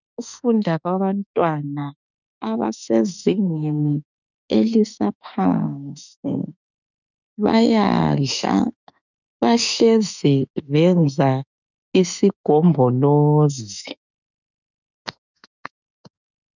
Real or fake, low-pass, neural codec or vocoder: fake; 7.2 kHz; autoencoder, 48 kHz, 32 numbers a frame, DAC-VAE, trained on Japanese speech